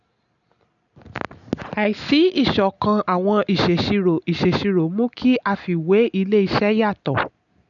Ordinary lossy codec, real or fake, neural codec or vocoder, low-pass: none; real; none; 7.2 kHz